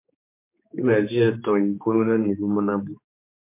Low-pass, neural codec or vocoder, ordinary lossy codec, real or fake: 3.6 kHz; codec, 16 kHz, 4 kbps, X-Codec, HuBERT features, trained on general audio; MP3, 24 kbps; fake